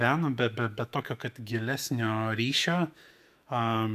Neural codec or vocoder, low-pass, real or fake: autoencoder, 48 kHz, 128 numbers a frame, DAC-VAE, trained on Japanese speech; 14.4 kHz; fake